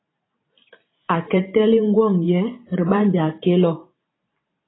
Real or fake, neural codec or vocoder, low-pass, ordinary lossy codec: fake; vocoder, 44.1 kHz, 128 mel bands every 512 samples, BigVGAN v2; 7.2 kHz; AAC, 16 kbps